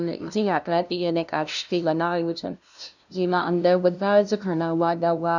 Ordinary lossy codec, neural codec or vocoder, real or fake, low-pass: none; codec, 16 kHz, 0.5 kbps, FunCodec, trained on LibriTTS, 25 frames a second; fake; 7.2 kHz